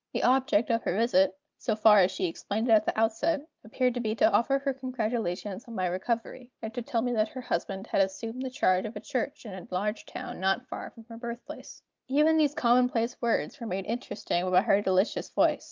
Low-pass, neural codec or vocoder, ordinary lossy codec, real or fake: 7.2 kHz; none; Opus, 24 kbps; real